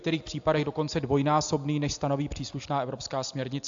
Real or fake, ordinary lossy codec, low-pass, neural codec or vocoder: real; AAC, 64 kbps; 7.2 kHz; none